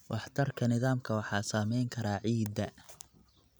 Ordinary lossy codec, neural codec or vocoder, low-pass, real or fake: none; none; none; real